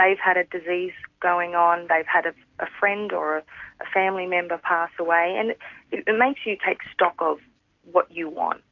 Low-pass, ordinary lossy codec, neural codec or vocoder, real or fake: 7.2 kHz; AAC, 48 kbps; none; real